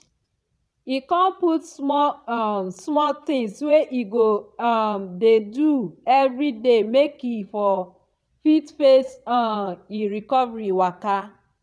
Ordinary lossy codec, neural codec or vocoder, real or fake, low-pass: none; vocoder, 22.05 kHz, 80 mel bands, Vocos; fake; none